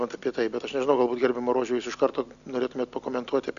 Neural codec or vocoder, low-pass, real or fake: none; 7.2 kHz; real